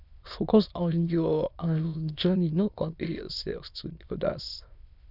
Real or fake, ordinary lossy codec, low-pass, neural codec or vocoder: fake; none; 5.4 kHz; autoencoder, 22.05 kHz, a latent of 192 numbers a frame, VITS, trained on many speakers